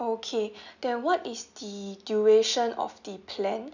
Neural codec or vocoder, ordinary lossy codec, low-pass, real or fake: none; none; 7.2 kHz; real